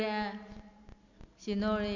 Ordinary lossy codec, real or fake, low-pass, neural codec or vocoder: none; real; 7.2 kHz; none